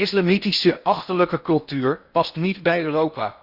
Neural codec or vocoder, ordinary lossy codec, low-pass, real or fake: codec, 16 kHz in and 24 kHz out, 0.6 kbps, FocalCodec, streaming, 4096 codes; Opus, 64 kbps; 5.4 kHz; fake